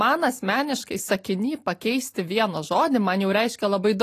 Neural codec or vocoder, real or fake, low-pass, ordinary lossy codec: none; real; 14.4 kHz; AAC, 48 kbps